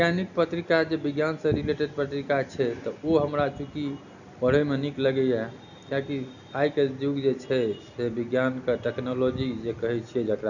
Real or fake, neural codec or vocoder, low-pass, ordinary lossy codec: real; none; 7.2 kHz; none